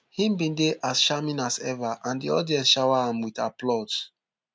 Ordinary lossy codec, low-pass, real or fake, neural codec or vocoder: none; none; real; none